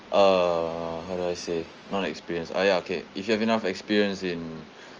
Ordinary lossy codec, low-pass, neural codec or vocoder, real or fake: Opus, 24 kbps; 7.2 kHz; none; real